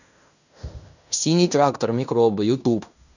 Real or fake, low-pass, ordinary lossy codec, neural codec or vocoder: fake; 7.2 kHz; none; codec, 16 kHz in and 24 kHz out, 0.9 kbps, LongCat-Audio-Codec, fine tuned four codebook decoder